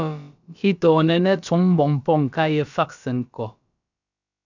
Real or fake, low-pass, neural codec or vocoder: fake; 7.2 kHz; codec, 16 kHz, about 1 kbps, DyCAST, with the encoder's durations